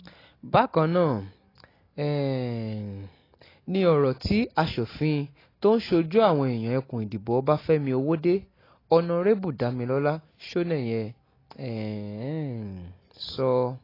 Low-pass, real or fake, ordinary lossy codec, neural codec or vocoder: 5.4 kHz; real; AAC, 24 kbps; none